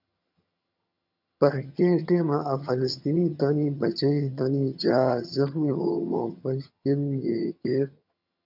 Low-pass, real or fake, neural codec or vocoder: 5.4 kHz; fake; vocoder, 22.05 kHz, 80 mel bands, HiFi-GAN